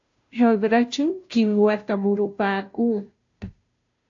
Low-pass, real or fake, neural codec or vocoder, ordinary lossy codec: 7.2 kHz; fake; codec, 16 kHz, 0.5 kbps, FunCodec, trained on Chinese and English, 25 frames a second; AAC, 48 kbps